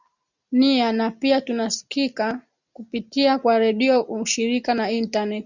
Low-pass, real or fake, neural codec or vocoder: 7.2 kHz; real; none